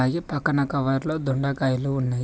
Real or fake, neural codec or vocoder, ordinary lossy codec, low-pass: real; none; none; none